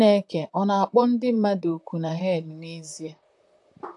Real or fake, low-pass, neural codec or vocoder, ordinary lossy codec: fake; 10.8 kHz; vocoder, 44.1 kHz, 128 mel bands, Pupu-Vocoder; AAC, 64 kbps